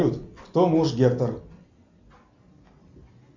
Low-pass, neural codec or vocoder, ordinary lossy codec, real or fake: 7.2 kHz; none; MP3, 64 kbps; real